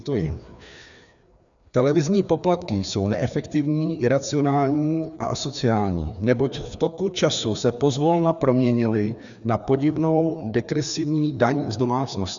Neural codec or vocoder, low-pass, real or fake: codec, 16 kHz, 2 kbps, FreqCodec, larger model; 7.2 kHz; fake